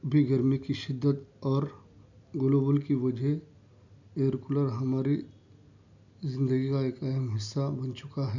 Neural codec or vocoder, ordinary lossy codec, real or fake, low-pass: none; none; real; 7.2 kHz